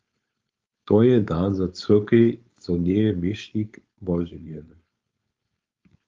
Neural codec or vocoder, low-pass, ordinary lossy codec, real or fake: codec, 16 kHz, 4.8 kbps, FACodec; 7.2 kHz; Opus, 32 kbps; fake